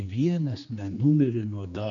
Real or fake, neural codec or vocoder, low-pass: fake; codec, 16 kHz, 2 kbps, X-Codec, HuBERT features, trained on general audio; 7.2 kHz